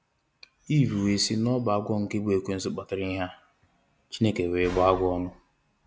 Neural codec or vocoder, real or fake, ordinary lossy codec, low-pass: none; real; none; none